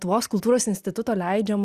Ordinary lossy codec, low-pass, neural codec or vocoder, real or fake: Opus, 64 kbps; 14.4 kHz; none; real